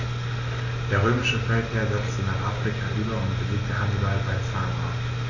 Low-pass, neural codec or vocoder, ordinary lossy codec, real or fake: 7.2 kHz; none; AAC, 32 kbps; real